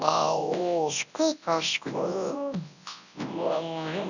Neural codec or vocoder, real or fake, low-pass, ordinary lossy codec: codec, 24 kHz, 0.9 kbps, WavTokenizer, large speech release; fake; 7.2 kHz; none